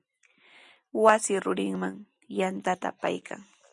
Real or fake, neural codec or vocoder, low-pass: real; none; 10.8 kHz